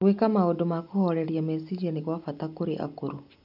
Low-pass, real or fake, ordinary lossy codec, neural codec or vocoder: 5.4 kHz; real; none; none